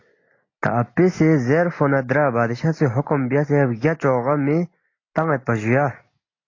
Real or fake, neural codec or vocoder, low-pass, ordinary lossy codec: real; none; 7.2 kHz; AAC, 32 kbps